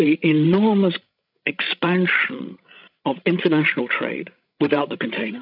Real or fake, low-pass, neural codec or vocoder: fake; 5.4 kHz; codec, 16 kHz, 16 kbps, FreqCodec, larger model